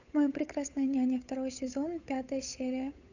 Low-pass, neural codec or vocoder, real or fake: 7.2 kHz; codec, 16 kHz, 8 kbps, FunCodec, trained on Chinese and English, 25 frames a second; fake